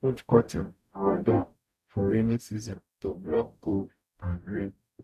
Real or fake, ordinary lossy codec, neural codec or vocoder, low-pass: fake; none; codec, 44.1 kHz, 0.9 kbps, DAC; 14.4 kHz